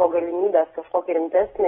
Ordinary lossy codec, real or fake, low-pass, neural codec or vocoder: AAC, 16 kbps; real; 19.8 kHz; none